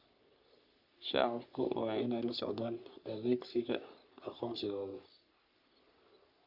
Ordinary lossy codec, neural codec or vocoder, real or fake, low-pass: Opus, 64 kbps; codec, 44.1 kHz, 3.4 kbps, Pupu-Codec; fake; 5.4 kHz